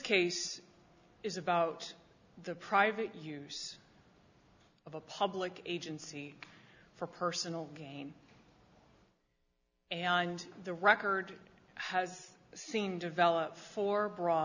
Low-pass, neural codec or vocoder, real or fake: 7.2 kHz; none; real